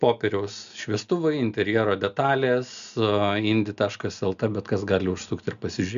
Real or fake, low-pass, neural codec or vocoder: real; 7.2 kHz; none